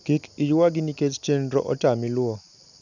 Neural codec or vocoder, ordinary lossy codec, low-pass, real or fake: none; none; 7.2 kHz; real